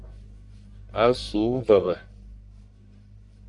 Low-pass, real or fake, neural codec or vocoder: 10.8 kHz; fake; codec, 44.1 kHz, 1.7 kbps, Pupu-Codec